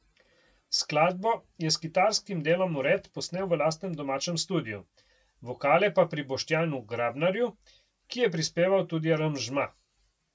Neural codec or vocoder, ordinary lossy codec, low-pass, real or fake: none; none; none; real